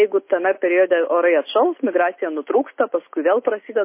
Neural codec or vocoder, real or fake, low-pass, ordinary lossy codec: none; real; 3.6 kHz; MP3, 24 kbps